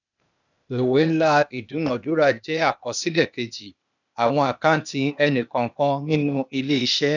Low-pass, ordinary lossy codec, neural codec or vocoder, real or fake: 7.2 kHz; none; codec, 16 kHz, 0.8 kbps, ZipCodec; fake